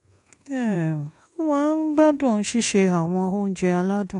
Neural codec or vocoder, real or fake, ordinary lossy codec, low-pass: codec, 24 kHz, 1.2 kbps, DualCodec; fake; AAC, 48 kbps; 10.8 kHz